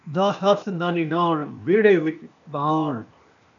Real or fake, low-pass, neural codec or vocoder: fake; 7.2 kHz; codec, 16 kHz, 0.8 kbps, ZipCodec